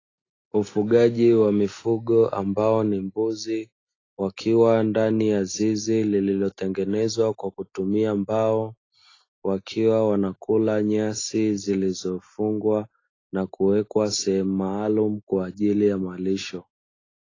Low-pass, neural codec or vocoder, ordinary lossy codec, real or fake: 7.2 kHz; none; AAC, 32 kbps; real